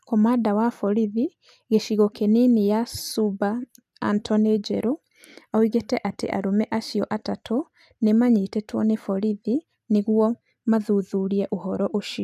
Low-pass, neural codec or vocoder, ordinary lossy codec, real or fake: 14.4 kHz; none; none; real